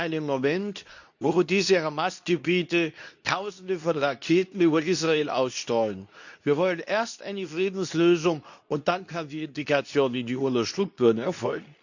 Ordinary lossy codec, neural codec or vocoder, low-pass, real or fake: none; codec, 24 kHz, 0.9 kbps, WavTokenizer, medium speech release version 2; 7.2 kHz; fake